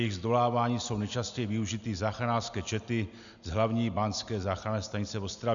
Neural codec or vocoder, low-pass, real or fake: none; 7.2 kHz; real